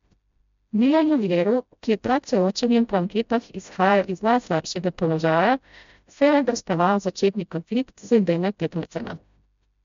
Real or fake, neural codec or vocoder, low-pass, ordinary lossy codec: fake; codec, 16 kHz, 0.5 kbps, FreqCodec, smaller model; 7.2 kHz; MP3, 64 kbps